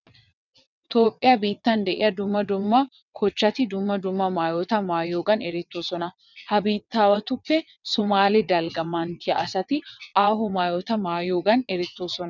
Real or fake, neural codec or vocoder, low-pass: fake; vocoder, 22.05 kHz, 80 mel bands, WaveNeXt; 7.2 kHz